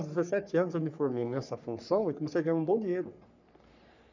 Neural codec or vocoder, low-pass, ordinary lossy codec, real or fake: codec, 44.1 kHz, 3.4 kbps, Pupu-Codec; 7.2 kHz; none; fake